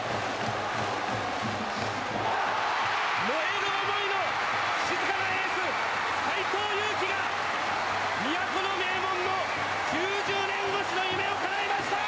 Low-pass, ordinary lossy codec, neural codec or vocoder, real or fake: none; none; none; real